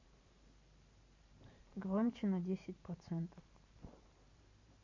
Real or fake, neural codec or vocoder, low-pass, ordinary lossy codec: fake; vocoder, 44.1 kHz, 128 mel bands every 512 samples, BigVGAN v2; 7.2 kHz; MP3, 32 kbps